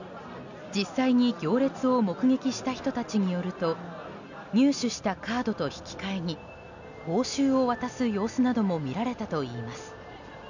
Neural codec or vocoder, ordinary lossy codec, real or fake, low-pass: none; none; real; 7.2 kHz